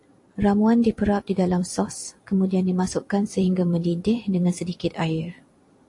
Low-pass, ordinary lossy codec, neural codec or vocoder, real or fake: 10.8 kHz; AAC, 48 kbps; vocoder, 44.1 kHz, 128 mel bands every 256 samples, BigVGAN v2; fake